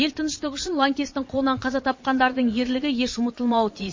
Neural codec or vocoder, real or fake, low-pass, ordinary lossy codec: vocoder, 22.05 kHz, 80 mel bands, Vocos; fake; 7.2 kHz; MP3, 32 kbps